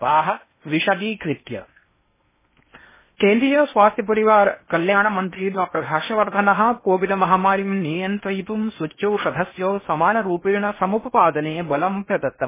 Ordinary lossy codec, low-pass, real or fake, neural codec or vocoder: MP3, 16 kbps; 3.6 kHz; fake; codec, 16 kHz in and 24 kHz out, 0.6 kbps, FocalCodec, streaming, 4096 codes